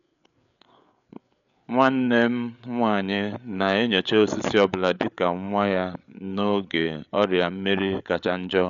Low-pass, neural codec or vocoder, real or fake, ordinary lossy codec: 7.2 kHz; codec, 16 kHz, 8 kbps, FreqCodec, larger model; fake; none